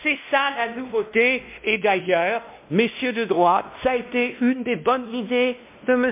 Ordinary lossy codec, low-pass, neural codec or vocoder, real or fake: MP3, 32 kbps; 3.6 kHz; codec, 16 kHz, 1 kbps, X-Codec, WavLM features, trained on Multilingual LibriSpeech; fake